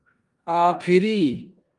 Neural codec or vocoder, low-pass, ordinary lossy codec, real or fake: codec, 16 kHz in and 24 kHz out, 0.9 kbps, LongCat-Audio-Codec, four codebook decoder; 10.8 kHz; Opus, 32 kbps; fake